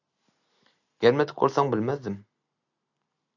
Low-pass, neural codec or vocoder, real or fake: 7.2 kHz; none; real